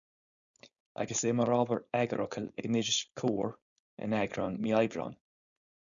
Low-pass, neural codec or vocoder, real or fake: 7.2 kHz; codec, 16 kHz, 4.8 kbps, FACodec; fake